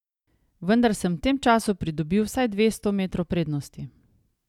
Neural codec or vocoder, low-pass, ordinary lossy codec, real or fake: none; 19.8 kHz; none; real